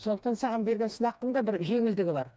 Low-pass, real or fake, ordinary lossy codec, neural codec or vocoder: none; fake; none; codec, 16 kHz, 2 kbps, FreqCodec, smaller model